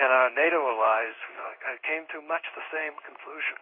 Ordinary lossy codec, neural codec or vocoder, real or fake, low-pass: MP3, 32 kbps; codec, 16 kHz in and 24 kHz out, 1 kbps, XY-Tokenizer; fake; 5.4 kHz